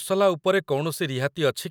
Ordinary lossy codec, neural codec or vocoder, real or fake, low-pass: none; none; real; none